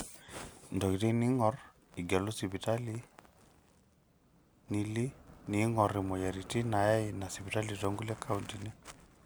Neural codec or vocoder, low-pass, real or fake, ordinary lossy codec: none; none; real; none